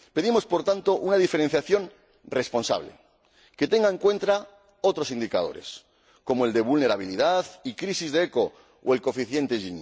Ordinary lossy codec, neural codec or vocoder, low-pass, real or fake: none; none; none; real